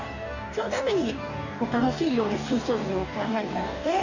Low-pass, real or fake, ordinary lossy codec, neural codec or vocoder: 7.2 kHz; fake; none; codec, 44.1 kHz, 2.6 kbps, DAC